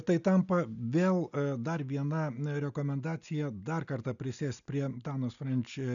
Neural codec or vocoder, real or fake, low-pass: none; real; 7.2 kHz